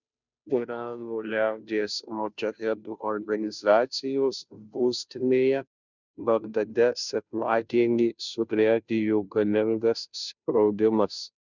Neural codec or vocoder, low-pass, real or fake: codec, 16 kHz, 0.5 kbps, FunCodec, trained on Chinese and English, 25 frames a second; 7.2 kHz; fake